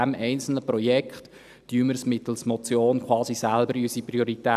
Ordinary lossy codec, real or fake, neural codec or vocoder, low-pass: none; real; none; 14.4 kHz